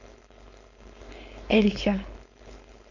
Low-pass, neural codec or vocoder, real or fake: 7.2 kHz; codec, 16 kHz, 4.8 kbps, FACodec; fake